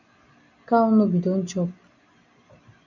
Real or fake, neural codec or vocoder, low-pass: real; none; 7.2 kHz